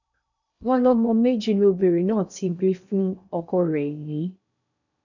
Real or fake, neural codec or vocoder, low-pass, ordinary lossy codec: fake; codec, 16 kHz in and 24 kHz out, 0.6 kbps, FocalCodec, streaming, 2048 codes; 7.2 kHz; none